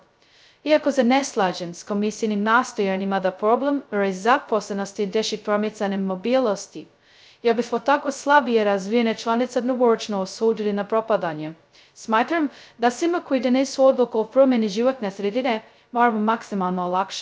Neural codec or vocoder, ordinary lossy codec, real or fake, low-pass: codec, 16 kHz, 0.2 kbps, FocalCodec; none; fake; none